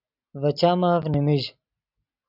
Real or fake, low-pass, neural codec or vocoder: real; 5.4 kHz; none